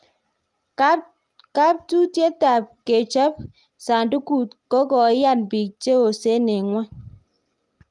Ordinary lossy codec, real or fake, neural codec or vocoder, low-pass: Opus, 32 kbps; real; none; 10.8 kHz